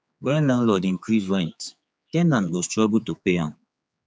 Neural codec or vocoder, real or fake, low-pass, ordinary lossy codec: codec, 16 kHz, 4 kbps, X-Codec, HuBERT features, trained on general audio; fake; none; none